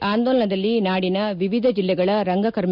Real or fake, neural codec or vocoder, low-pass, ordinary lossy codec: real; none; 5.4 kHz; none